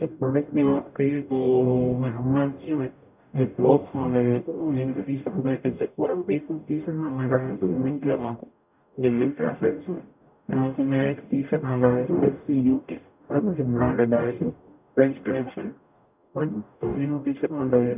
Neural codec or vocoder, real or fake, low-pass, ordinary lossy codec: codec, 44.1 kHz, 0.9 kbps, DAC; fake; 3.6 kHz; none